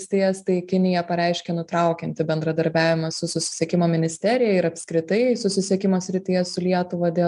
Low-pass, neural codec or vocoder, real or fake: 10.8 kHz; none; real